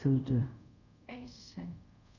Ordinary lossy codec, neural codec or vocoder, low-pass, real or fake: none; codec, 24 kHz, 0.5 kbps, DualCodec; 7.2 kHz; fake